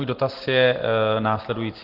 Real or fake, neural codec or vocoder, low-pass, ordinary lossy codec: real; none; 5.4 kHz; Opus, 16 kbps